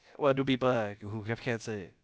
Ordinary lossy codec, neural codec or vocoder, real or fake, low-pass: none; codec, 16 kHz, about 1 kbps, DyCAST, with the encoder's durations; fake; none